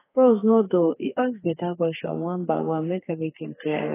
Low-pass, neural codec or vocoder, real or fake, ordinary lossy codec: 3.6 kHz; codec, 32 kHz, 1.9 kbps, SNAC; fake; AAC, 16 kbps